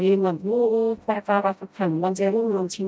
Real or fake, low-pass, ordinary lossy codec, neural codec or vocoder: fake; none; none; codec, 16 kHz, 0.5 kbps, FreqCodec, smaller model